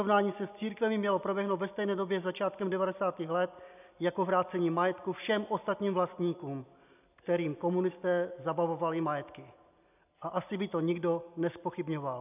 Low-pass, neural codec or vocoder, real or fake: 3.6 kHz; none; real